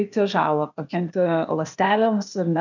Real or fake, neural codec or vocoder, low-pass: fake; codec, 16 kHz, 0.8 kbps, ZipCodec; 7.2 kHz